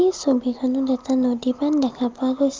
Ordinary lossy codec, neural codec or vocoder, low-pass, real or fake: Opus, 24 kbps; none; 7.2 kHz; real